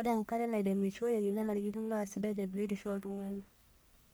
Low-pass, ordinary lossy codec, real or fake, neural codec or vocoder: none; none; fake; codec, 44.1 kHz, 1.7 kbps, Pupu-Codec